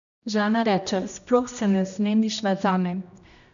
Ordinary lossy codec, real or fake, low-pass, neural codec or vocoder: none; fake; 7.2 kHz; codec, 16 kHz, 1 kbps, X-Codec, HuBERT features, trained on general audio